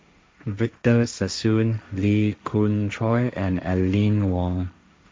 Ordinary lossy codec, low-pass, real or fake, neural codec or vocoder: none; none; fake; codec, 16 kHz, 1.1 kbps, Voila-Tokenizer